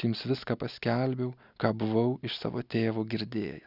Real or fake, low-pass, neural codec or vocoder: real; 5.4 kHz; none